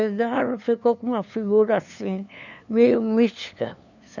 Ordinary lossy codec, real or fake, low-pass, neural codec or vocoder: none; fake; 7.2 kHz; codec, 16 kHz, 2 kbps, FunCodec, trained on LibriTTS, 25 frames a second